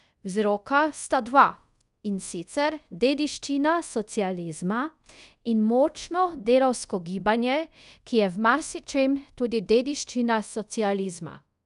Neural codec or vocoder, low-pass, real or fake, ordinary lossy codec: codec, 24 kHz, 0.5 kbps, DualCodec; 10.8 kHz; fake; none